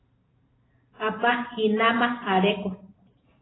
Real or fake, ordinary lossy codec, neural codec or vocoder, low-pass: fake; AAC, 16 kbps; vocoder, 44.1 kHz, 128 mel bands every 512 samples, BigVGAN v2; 7.2 kHz